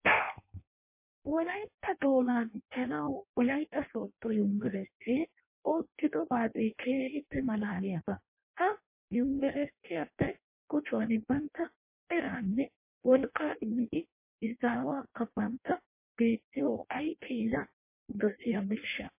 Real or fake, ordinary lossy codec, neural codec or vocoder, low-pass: fake; MP3, 24 kbps; codec, 16 kHz in and 24 kHz out, 0.6 kbps, FireRedTTS-2 codec; 3.6 kHz